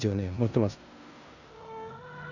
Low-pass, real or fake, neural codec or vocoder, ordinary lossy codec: 7.2 kHz; fake; codec, 16 kHz in and 24 kHz out, 0.9 kbps, LongCat-Audio-Codec, four codebook decoder; none